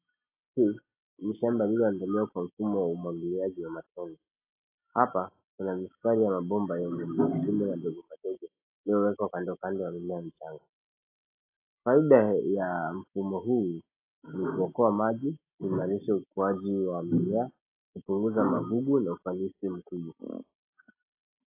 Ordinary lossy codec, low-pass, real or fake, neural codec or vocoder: AAC, 24 kbps; 3.6 kHz; real; none